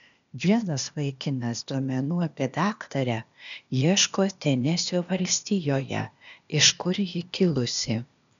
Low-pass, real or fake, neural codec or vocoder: 7.2 kHz; fake; codec, 16 kHz, 0.8 kbps, ZipCodec